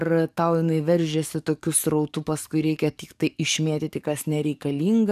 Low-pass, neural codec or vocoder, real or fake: 14.4 kHz; codec, 44.1 kHz, 7.8 kbps, DAC; fake